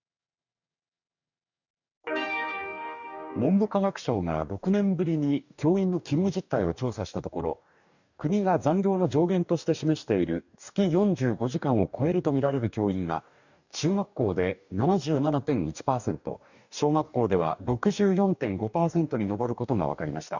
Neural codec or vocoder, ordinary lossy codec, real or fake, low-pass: codec, 44.1 kHz, 2.6 kbps, DAC; none; fake; 7.2 kHz